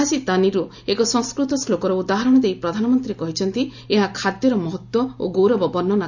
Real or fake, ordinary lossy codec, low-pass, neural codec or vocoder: real; none; 7.2 kHz; none